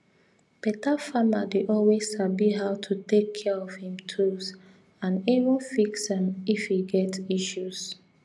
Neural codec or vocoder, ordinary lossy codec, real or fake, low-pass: none; none; real; none